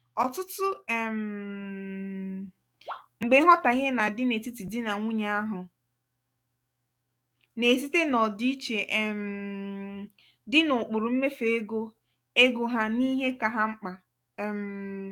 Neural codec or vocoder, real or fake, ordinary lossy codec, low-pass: codec, 44.1 kHz, 7.8 kbps, DAC; fake; none; 19.8 kHz